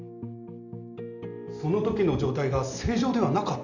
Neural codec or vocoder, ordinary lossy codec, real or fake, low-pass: none; none; real; 7.2 kHz